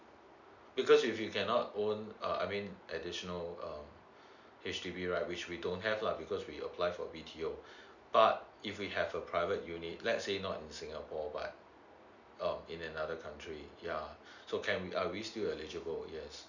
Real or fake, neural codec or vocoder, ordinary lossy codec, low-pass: real; none; none; 7.2 kHz